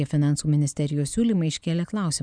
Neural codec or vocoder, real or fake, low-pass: none; real; 9.9 kHz